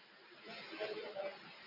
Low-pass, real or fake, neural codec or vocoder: 5.4 kHz; real; none